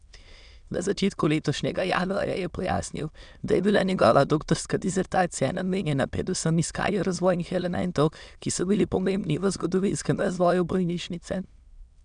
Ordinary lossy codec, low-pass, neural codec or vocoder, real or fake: none; 9.9 kHz; autoencoder, 22.05 kHz, a latent of 192 numbers a frame, VITS, trained on many speakers; fake